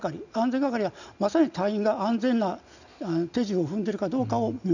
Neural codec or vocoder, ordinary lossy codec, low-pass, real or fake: none; none; 7.2 kHz; real